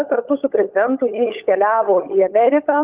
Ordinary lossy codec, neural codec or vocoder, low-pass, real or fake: Opus, 32 kbps; codec, 16 kHz, 16 kbps, FunCodec, trained on LibriTTS, 50 frames a second; 3.6 kHz; fake